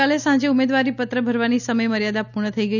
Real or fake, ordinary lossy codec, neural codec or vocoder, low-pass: real; none; none; 7.2 kHz